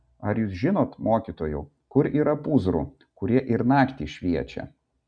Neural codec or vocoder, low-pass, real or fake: none; 9.9 kHz; real